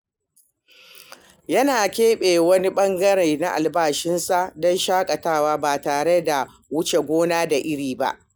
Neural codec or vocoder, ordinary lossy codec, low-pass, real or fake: none; none; none; real